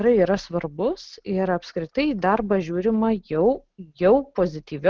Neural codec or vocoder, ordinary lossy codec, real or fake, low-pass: none; Opus, 16 kbps; real; 7.2 kHz